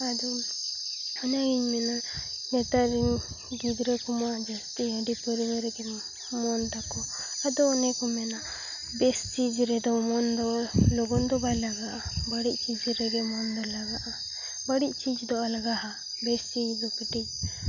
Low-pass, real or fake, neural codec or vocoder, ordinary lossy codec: 7.2 kHz; real; none; none